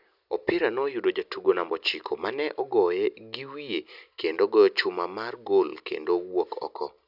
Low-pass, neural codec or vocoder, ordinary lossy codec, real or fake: 5.4 kHz; none; none; real